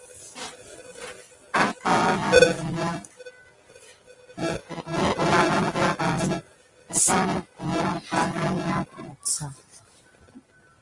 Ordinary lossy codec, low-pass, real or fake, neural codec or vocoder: Opus, 32 kbps; 10.8 kHz; real; none